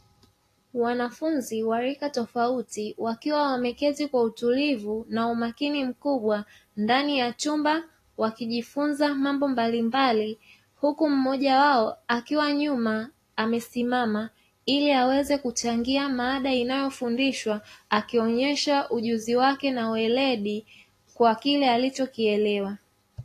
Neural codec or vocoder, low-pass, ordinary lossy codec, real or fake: none; 14.4 kHz; AAC, 48 kbps; real